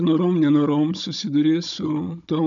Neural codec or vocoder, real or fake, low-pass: codec, 16 kHz, 16 kbps, FunCodec, trained on Chinese and English, 50 frames a second; fake; 7.2 kHz